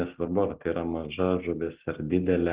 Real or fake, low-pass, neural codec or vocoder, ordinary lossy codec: real; 3.6 kHz; none; Opus, 16 kbps